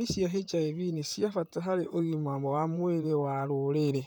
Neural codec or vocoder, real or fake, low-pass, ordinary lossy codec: vocoder, 44.1 kHz, 128 mel bands, Pupu-Vocoder; fake; none; none